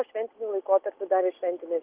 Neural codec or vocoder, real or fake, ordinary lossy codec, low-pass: none; real; Opus, 24 kbps; 3.6 kHz